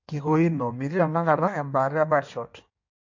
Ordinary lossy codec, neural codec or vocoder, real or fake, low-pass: MP3, 48 kbps; codec, 16 kHz in and 24 kHz out, 1.1 kbps, FireRedTTS-2 codec; fake; 7.2 kHz